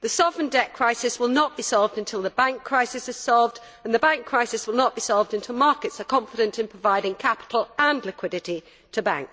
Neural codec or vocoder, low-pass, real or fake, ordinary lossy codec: none; none; real; none